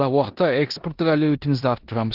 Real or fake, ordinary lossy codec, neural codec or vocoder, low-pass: fake; Opus, 16 kbps; codec, 16 kHz in and 24 kHz out, 0.9 kbps, LongCat-Audio-Codec, four codebook decoder; 5.4 kHz